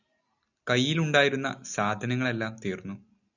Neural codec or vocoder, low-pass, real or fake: none; 7.2 kHz; real